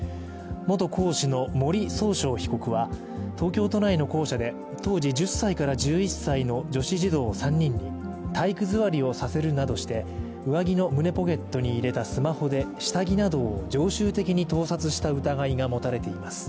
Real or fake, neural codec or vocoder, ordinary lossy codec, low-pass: real; none; none; none